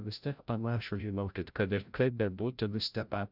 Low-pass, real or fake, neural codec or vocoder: 5.4 kHz; fake; codec, 16 kHz, 0.5 kbps, FreqCodec, larger model